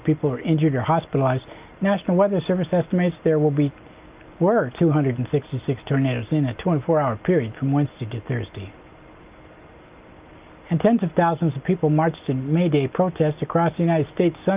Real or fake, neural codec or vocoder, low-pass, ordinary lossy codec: real; none; 3.6 kHz; Opus, 24 kbps